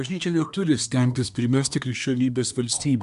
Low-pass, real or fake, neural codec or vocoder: 10.8 kHz; fake; codec, 24 kHz, 1 kbps, SNAC